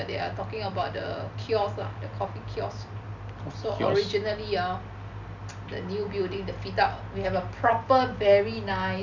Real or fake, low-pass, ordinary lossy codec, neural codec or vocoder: real; 7.2 kHz; none; none